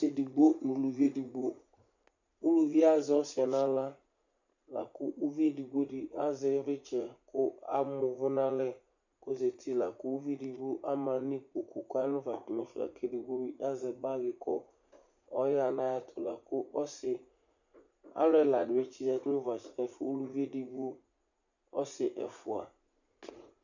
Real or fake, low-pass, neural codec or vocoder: fake; 7.2 kHz; vocoder, 44.1 kHz, 80 mel bands, Vocos